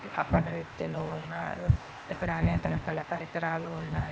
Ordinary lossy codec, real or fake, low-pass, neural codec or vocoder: none; fake; none; codec, 16 kHz, 0.8 kbps, ZipCodec